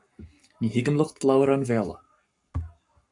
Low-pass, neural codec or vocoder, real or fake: 10.8 kHz; codec, 44.1 kHz, 7.8 kbps, Pupu-Codec; fake